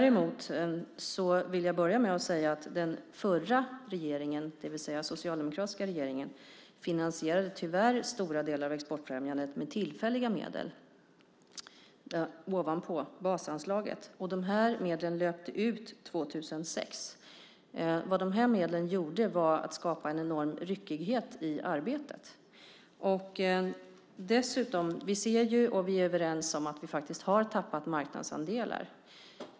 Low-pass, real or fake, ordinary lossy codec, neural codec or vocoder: none; real; none; none